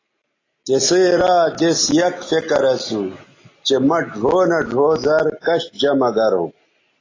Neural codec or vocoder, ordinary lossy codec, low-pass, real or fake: none; AAC, 32 kbps; 7.2 kHz; real